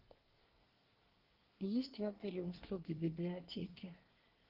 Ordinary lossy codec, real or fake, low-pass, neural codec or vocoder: Opus, 16 kbps; fake; 5.4 kHz; codec, 24 kHz, 1 kbps, SNAC